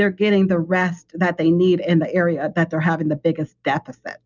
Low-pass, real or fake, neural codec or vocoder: 7.2 kHz; real; none